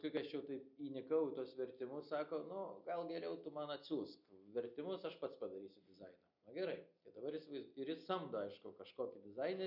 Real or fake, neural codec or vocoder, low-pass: real; none; 5.4 kHz